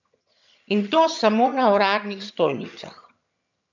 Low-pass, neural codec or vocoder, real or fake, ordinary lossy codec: 7.2 kHz; vocoder, 22.05 kHz, 80 mel bands, HiFi-GAN; fake; none